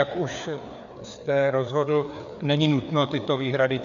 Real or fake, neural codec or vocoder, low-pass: fake; codec, 16 kHz, 4 kbps, FreqCodec, larger model; 7.2 kHz